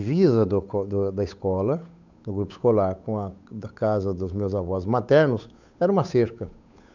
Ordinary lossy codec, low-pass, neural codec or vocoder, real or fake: none; 7.2 kHz; codec, 16 kHz, 8 kbps, FunCodec, trained on Chinese and English, 25 frames a second; fake